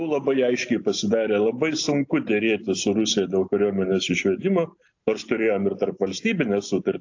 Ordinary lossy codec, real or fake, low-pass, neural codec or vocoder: AAC, 48 kbps; real; 7.2 kHz; none